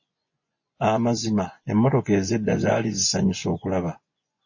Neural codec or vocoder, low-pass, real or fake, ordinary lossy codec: vocoder, 24 kHz, 100 mel bands, Vocos; 7.2 kHz; fake; MP3, 32 kbps